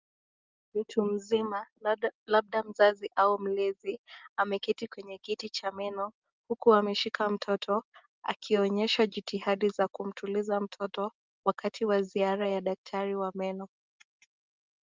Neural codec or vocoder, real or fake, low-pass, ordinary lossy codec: none; real; 7.2 kHz; Opus, 24 kbps